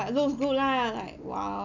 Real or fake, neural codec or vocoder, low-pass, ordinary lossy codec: real; none; 7.2 kHz; none